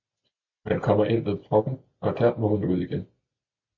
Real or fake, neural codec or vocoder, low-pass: real; none; 7.2 kHz